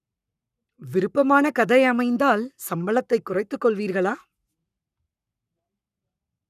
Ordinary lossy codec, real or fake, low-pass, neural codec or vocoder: none; fake; 14.4 kHz; codec, 44.1 kHz, 7.8 kbps, Pupu-Codec